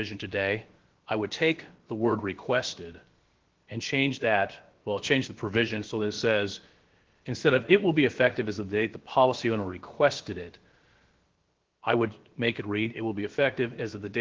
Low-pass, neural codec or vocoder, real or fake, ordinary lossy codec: 7.2 kHz; codec, 16 kHz, about 1 kbps, DyCAST, with the encoder's durations; fake; Opus, 16 kbps